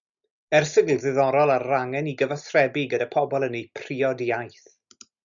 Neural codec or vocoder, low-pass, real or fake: none; 7.2 kHz; real